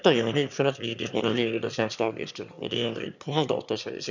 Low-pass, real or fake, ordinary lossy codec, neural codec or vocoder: 7.2 kHz; fake; none; autoencoder, 22.05 kHz, a latent of 192 numbers a frame, VITS, trained on one speaker